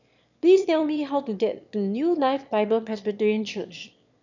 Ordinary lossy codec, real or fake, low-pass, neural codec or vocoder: none; fake; 7.2 kHz; autoencoder, 22.05 kHz, a latent of 192 numbers a frame, VITS, trained on one speaker